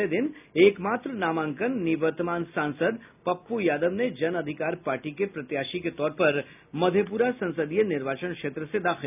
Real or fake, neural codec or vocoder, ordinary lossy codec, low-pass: real; none; none; 3.6 kHz